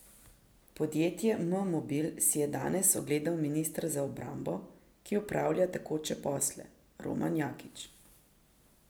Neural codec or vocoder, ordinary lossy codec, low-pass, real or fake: none; none; none; real